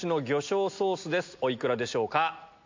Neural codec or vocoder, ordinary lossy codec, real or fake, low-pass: none; MP3, 64 kbps; real; 7.2 kHz